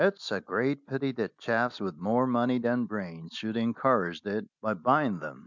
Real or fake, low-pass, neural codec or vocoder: real; 7.2 kHz; none